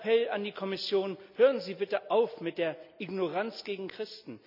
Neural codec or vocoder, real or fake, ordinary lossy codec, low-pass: none; real; none; 5.4 kHz